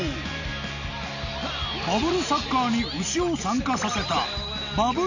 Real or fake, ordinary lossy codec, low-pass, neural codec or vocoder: real; none; 7.2 kHz; none